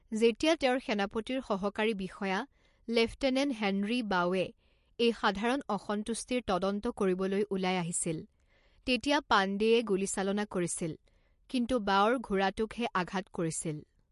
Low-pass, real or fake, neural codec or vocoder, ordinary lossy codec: 14.4 kHz; real; none; MP3, 48 kbps